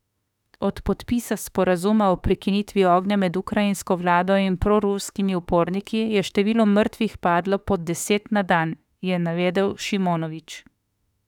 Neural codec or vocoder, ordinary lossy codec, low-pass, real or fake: autoencoder, 48 kHz, 32 numbers a frame, DAC-VAE, trained on Japanese speech; none; 19.8 kHz; fake